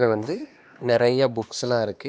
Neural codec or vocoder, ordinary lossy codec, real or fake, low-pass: codec, 16 kHz, 2 kbps, X-Codec, HuBERT features, trained on LibriSpeech; none; fake; none